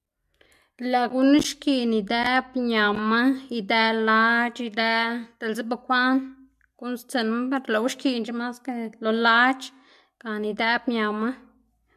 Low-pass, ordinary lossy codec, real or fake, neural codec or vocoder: 14.4 kHz; MP3, 64 kbps; real; none